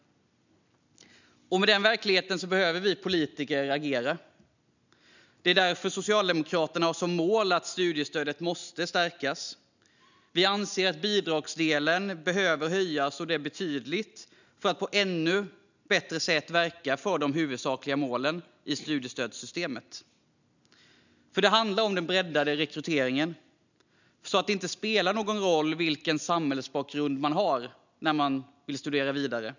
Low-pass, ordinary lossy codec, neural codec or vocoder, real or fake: 7.2 kHz; none; none; real